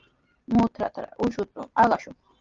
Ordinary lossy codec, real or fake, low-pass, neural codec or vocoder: Opus, 32 kbps; real; 7.2 kHz; none